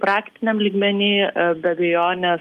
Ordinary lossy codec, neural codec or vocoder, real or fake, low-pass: Opus, 32 kbps; none; real; 14.4 kHz